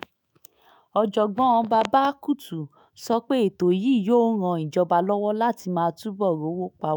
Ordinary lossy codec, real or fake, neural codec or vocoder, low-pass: none; fake; autoencoder, 48 kHz, 128 numbers a frame, DAC-VAE, trained on Japanese speech; none